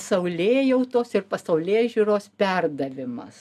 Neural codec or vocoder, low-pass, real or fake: none; 14.4 kHz; real